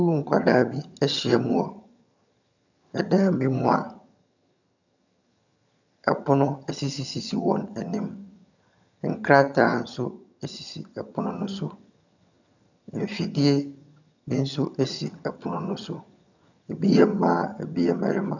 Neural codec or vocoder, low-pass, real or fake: vocoder, 22.05 kHz, 80 mel bands, HiFi-GAN; 7.2 kHz; fake